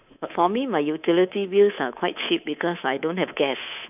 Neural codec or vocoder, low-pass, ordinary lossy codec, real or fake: codec, 24 kHz, 3.1 kbps, DualCodec; 3.6 kHz; none; fake